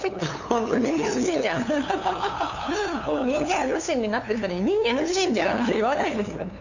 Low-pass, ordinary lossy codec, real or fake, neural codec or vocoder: 7.2 kHz; none; fake; codec, 16 kHz, 2 kbps, FunCodec, trained on LibriTTS, 25 frames a second